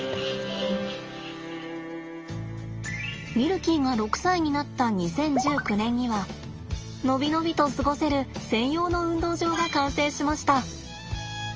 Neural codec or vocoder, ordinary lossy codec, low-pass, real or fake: none; Opus, 24 kbps; 7.2 kHz; real